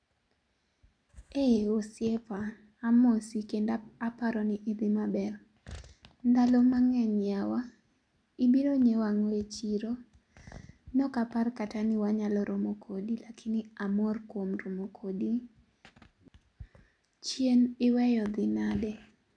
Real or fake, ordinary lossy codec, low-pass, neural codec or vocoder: real; none; 9.9 kHz; none